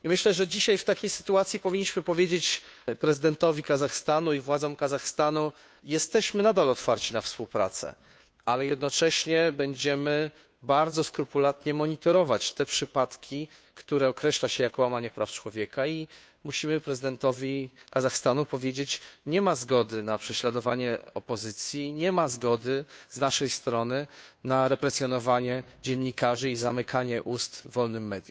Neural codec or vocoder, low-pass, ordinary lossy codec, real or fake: codec, 16 kHz, 2 kbps, FunCodec, trained on Chinese and English, 25 frames a second; none; none; fake